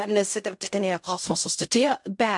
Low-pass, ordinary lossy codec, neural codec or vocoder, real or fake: 10.8 kHz; MP3, 64 kbps; codec, 16 kHz in and 24 kHz out, 0.4 kbps, LongCat-Audio-Codec, fine tuned four codebook decoder; fake